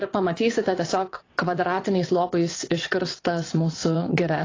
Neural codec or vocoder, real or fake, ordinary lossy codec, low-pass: vocoder, 22.05 kHz, 80 mel bands, WaveNeXt; fake; AAC, 32 kbps; 7.2 kHz